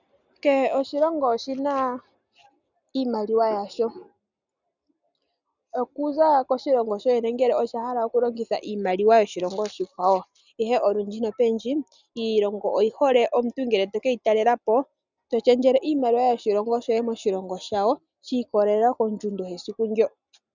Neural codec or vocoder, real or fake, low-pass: none; real; 7.2 kHz